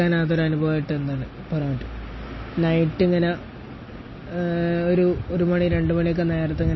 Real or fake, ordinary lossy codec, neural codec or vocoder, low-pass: real; MP3, 24 kbps; none; 7.2 kHz